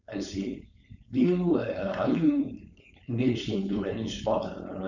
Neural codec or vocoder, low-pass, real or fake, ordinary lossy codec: codec, 16 kHz, 4.8 kbps, FACodec; 7.2 kHz; fake; none